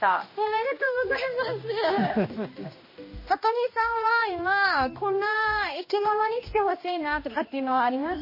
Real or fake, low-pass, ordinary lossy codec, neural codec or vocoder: fake; 5.4 kHz; MP3, 24 kbps; codec, 16 kHz, 1 kbps, X-Codec, HuBERT features, trained on general audio